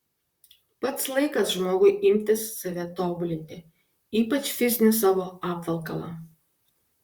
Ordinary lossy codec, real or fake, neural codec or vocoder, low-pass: Opus, 64 kbps; fake; vocoder, 44.1 kHz, 128 mel bands, Pupu-Vocoder; 19.8 kHz